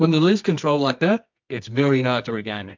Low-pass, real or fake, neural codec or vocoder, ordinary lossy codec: 7.2 kHz; fake; codec, 24 kHz, 0.9 kbps, WavTokenizer, medium music audio release; MP3, 64 kbps